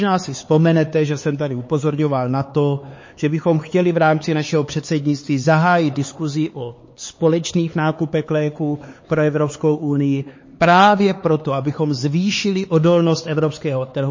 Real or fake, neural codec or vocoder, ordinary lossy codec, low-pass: fake; codec, 16 kHz, 4 kbps, X-Codec, HuBERT features, trained on LibriSpeech; MP3, 32 kbps; 7.2 kHz